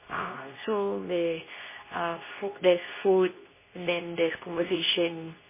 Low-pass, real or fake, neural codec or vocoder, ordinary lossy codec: 3.6 kHz; fake; codec, 24 kHz, 0.9 kbps, WavTokenizer, medium speech release version 2; MP3, 16 kbps